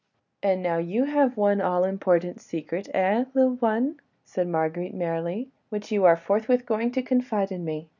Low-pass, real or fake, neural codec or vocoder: 7.2 kHz; real; none